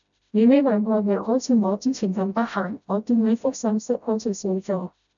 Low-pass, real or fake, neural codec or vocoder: 7.2 kHz; fake; codec, 16 kHz, 0.5 kbps, FreqCodec, smaller model